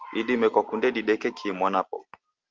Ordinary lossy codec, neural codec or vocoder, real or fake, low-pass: Opus, 32 kbps; none; real; 7.2 kHz